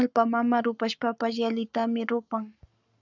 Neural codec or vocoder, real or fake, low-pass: codec, 44.1 kHz, 7.8 kbps, Pupu-Codec; fake; 7.2 kHz